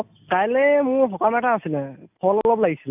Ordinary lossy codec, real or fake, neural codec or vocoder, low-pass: AAC, 32 kbps; real; none; 3.6 kHz